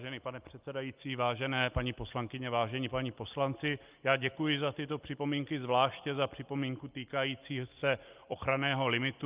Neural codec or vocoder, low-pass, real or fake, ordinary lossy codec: none; 3.6 kHz; real; Opus, 24 kbps